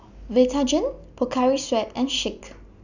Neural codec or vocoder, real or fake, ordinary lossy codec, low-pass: none; real; none; 7.2 kHz